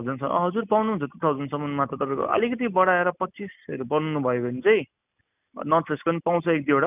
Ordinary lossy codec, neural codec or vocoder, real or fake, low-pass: none; none; real; 3.6 kHz